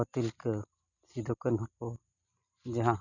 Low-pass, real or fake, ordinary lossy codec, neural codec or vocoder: 7.2 kHz; real; none; none